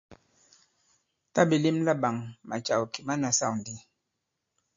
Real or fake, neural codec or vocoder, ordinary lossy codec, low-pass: real; none; MP3, 48 kbps; 7.2 kHz